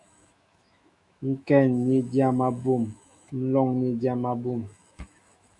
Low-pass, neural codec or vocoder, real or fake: 10.8 kHz; autoencoder, 48 kHz, 128 numbers a frame, DAC-VAE, trained on Japanese speech; fake